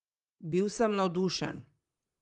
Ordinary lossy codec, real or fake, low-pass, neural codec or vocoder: Opus, 24 kbps; fake; 10.8 kHz; vocoder, 44.1 kHz, 128 mel bands, Pupu-Vocoder